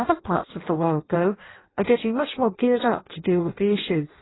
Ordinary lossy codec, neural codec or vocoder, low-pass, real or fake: AAC, 16 kbps; codec, 16 kHz in and 24 kHz out, 0.6 kbps, FireRedTTS-2 codec; 7.2 kHz; fake